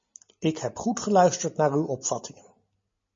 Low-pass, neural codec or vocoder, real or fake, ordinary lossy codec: 7.2 kHz; none; real; MP3, 32 kbps